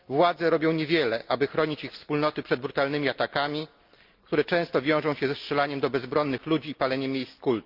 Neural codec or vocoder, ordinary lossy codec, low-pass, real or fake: none; Opus, 32 kbps; 5.4 kHz; real